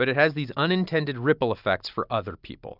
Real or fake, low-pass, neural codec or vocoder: real; 5.4 kHz; none